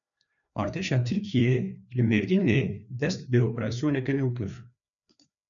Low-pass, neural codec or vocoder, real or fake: 7.2 kHz; codec, 16 kHz, 2 kbps, FreqCodec, larger model; fake